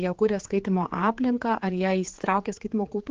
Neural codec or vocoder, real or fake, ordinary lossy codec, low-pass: codec, 16 kHz, 4 kbps, X-Codec, HuBERT features, trained on general audio; fake; Opus, 16 kbps; 7.2 kHz